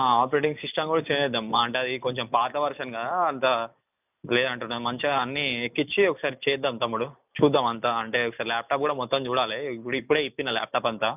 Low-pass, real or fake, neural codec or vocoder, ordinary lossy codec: 3.6 kHz; real; none; none